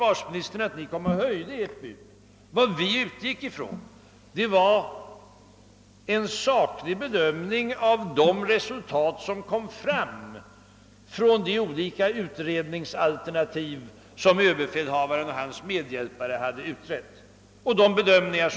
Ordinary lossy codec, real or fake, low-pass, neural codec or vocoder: none; real; none; none